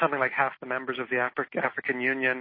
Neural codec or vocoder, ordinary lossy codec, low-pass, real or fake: none; MP3, 24 kbps; 5.4 kHz; real